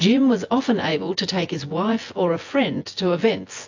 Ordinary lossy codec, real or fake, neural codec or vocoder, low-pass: AAC, 48 kbps; fake; vocoder, 24 kHz, 100 mel bands, Vocos; 7.2 kHz